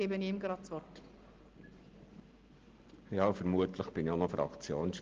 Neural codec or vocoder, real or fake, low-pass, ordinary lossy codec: none; real; 7.2 kHz; Opus, 16 kbps